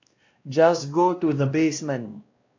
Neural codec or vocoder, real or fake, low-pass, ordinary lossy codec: codec, 16 kHz, 1 kbps, X-Codec, WavLM features, trained on Multilingual LibriSpeech; fake; 7.2 kHz; AAC, 48 kbps